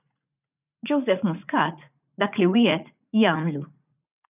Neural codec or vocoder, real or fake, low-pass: vocoder, 44.1 kHz, 80 mel bands, Vocos; fake; 3.6 kHz